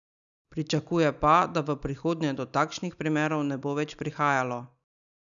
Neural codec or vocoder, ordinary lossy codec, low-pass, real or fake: none; none; 7.2 kHz; real